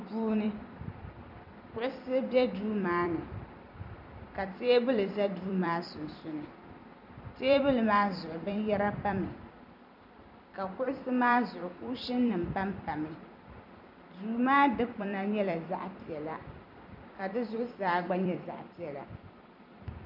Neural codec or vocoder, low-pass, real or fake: vocoder, 44.1 kHz, 128 mel bands every 256 samples, BigVGAN v2; 5.4 kHz; fake